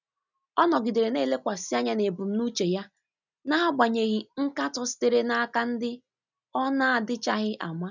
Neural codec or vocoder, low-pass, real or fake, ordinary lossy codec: none; 7.2 kHz; real; none